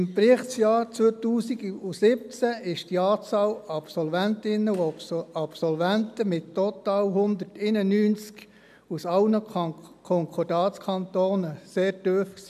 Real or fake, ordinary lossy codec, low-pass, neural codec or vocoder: real; MP3, 96 kbps; 14.4 kHz; none